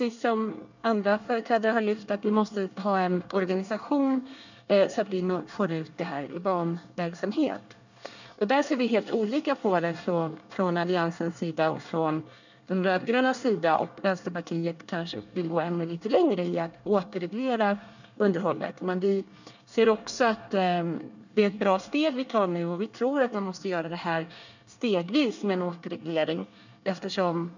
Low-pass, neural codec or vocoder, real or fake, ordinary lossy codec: 7.2 kHz; codec, 24 kHz, 1 kbps, SNAC; fake; none